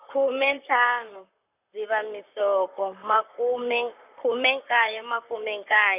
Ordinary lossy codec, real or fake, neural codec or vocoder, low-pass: none; fake; vocoder, 44.1 kHz, 128 mel bands, Pupu-Vocoder; 3.6 kHz